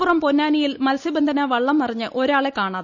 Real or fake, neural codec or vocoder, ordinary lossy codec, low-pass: real; none; none; 7.2 kHz